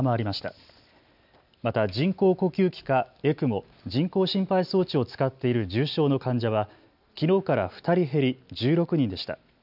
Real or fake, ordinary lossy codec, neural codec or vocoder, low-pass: real; none; none; 5.4 kHz